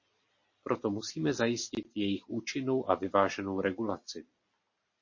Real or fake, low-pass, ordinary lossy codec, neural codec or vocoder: real; 7.2 kHz; MP3, 32 kbps; none